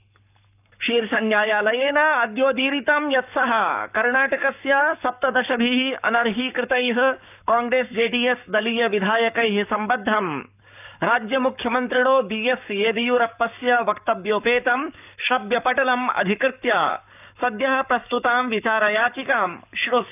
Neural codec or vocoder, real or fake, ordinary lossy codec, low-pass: codec, 44.1 kHz, 7.8 kbps, Pupu-Codec; fake; none; 3.6 kHz